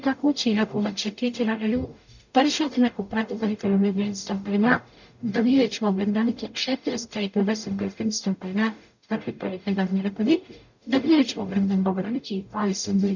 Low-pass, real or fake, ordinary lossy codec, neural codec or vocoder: 7.2 kHz; fake; none; codec, 44.1 kHz, 0.9 kbps, DAC